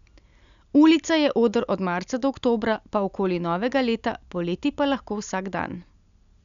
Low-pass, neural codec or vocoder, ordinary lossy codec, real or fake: 7.2 kHz; none; none; real